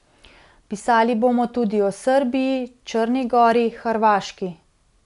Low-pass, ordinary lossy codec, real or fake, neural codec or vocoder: 10.8 kHz; none; real; none